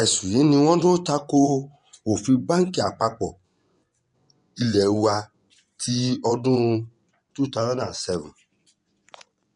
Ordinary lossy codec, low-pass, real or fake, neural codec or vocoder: none; 10.8 kHz; fake; vocoder, 24 kHz, 100 mel bands, Vocos